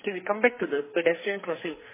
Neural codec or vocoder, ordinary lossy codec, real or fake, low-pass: codec, 44.1 kHz, 2.6 kbps, SNAC; MP3, 16 kbps; fake; 3.6 kHz